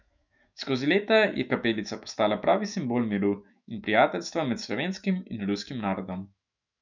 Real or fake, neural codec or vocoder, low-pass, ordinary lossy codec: fake; autoencoder, 48 kHz, 128 numbers a frame, DAC-VAE, trained on Japanese speech; 7.2 kHz; none